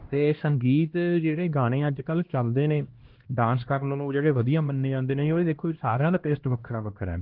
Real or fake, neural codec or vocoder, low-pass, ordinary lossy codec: fake; codec, 16 kHz, 1 kbps, X-Codec, HuBERT features, trained on LibriSpeech; 5.4 kHz; Opus, 16 kbps